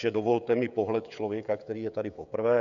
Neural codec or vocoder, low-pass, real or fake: codec, 16 kHz, 16 kbps, FreqCodec, smaller model; 7.2 kHz; fake